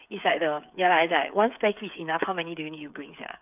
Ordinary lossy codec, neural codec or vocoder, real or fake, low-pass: none; codec, 16 kHz, 8 kbps, FreqCodec, smaller model; fake; 3.6 kHz